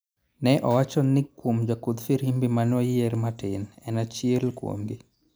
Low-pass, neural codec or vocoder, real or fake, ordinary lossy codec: none; none; real; none